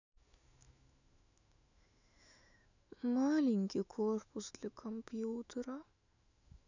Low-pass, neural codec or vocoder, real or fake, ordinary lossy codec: 7.2 kHz; autoencoder, 48 kHz, 128 numbers a frame, DAC-VAE, trained on Japanese speech; fake; none